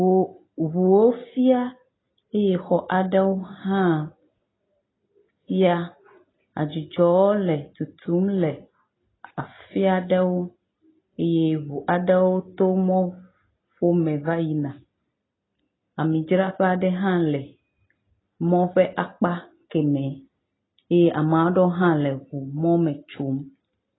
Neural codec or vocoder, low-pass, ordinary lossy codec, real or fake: none; 7.2 kHz; AAC, 16 kbps; real